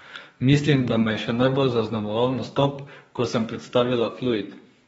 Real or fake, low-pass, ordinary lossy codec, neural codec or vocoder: fake; 14.4 kHz; AAC, 24 kbps; codec, 32 kHz, 1.9 kbps, SNAC